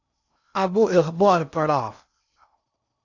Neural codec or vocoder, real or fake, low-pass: codec, 16 kHz in and 24 kHz out, 0.6 kbps, FocalCodec, streaming, 2048 codes; fake; 7.2 kHz